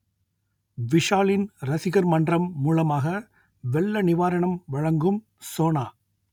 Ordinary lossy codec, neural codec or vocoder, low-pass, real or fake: none; none; 19.8 kHz; real